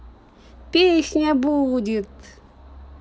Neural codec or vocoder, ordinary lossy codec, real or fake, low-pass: none; none; real; none